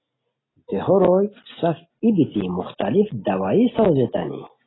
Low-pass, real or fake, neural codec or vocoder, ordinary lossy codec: 7.2 kHz; real; none; AAC, 16 kbps